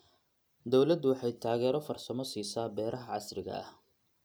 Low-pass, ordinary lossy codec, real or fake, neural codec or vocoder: none; none; real; none